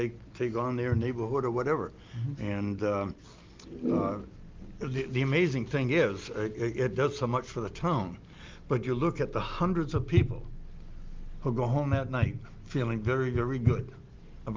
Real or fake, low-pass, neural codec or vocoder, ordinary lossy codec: real; 7.2 kHz; none; Opus, 24 kbps